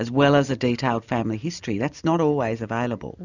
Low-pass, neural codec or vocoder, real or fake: 7.2 kHz; none; real